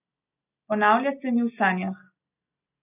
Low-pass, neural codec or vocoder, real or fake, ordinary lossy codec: 3.6 kHz; none; real; none